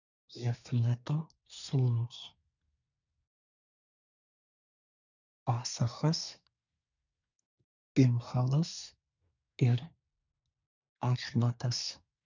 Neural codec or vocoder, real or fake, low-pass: codec, 24 kHz, 1 kbps, SNAC; fake; 7.2 kHz